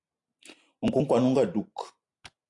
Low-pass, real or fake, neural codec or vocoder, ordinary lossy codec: 10.8 kHz; real; none; AAC, 64 kbps